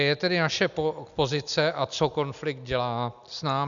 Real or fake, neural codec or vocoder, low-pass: real; none; 7.2 kHz